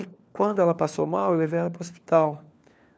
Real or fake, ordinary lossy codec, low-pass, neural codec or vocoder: fake; none; none; codec, 16 kHz, 4 kbps, FunCodec, trained on LibriTTS, 50 frames a second